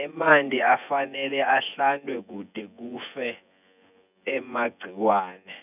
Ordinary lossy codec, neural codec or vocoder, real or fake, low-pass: none; vocoder, 24 kHz, 100 mel bands, Vocos; fake; 3.6 kHz